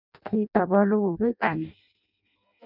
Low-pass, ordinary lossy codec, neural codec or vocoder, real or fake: 5.4 kHz; none; codec, 16 kHz in and 24 kHz out, 0.6 kbps, FireRedTTS-2 codec; fake